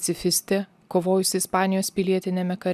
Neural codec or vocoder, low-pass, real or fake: none; 14.4 kHz; real